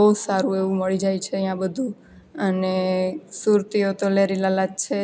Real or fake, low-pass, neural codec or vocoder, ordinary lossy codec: real; none; none; none